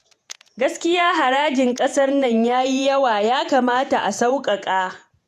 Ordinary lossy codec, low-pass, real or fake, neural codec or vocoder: AAC, 96 kbps; 14.4 kHz; fake; vocoder, 48 kHz, 128 mel bands, Vocos